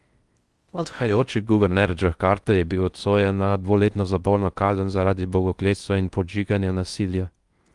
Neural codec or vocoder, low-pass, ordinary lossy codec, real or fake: codec, 16 kHz in and 24 kHz out, 0.6 kbps, FocalCodec, streaming, 4096 codes; 10.8 kHz; Opus, 32 kbps; fake